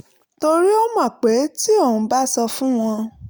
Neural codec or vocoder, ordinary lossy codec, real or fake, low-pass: none; none; real; none